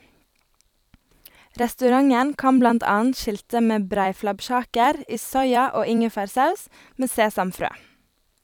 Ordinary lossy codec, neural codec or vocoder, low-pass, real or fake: none; vocoder, 44.1 kHz, 128 mel bands every 256 samples, BigVGAN v2; 19.8 kHz; fake